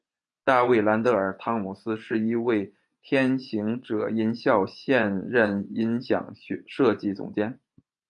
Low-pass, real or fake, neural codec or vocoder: 10.8 kHz; fake; vocoder, 24 kHz, 100 mel bands, Vocos